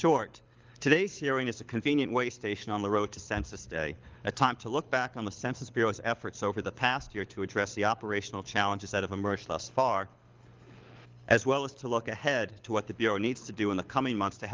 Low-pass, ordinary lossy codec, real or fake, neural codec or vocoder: 7.2 kHz; Opus, 24 kbps; fake; codec, 24 kHz, 6 kbps, HILCodec